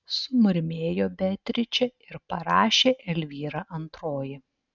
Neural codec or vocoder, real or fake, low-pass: none; real; 7.2 kHz